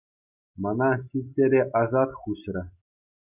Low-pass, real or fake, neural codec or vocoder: 3.6 kHz; real; none